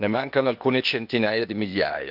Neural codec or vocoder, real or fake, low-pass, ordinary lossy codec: codec, 16 kHz, 0.8 kbps, ZipCodec; fake; 5.4 kHz; none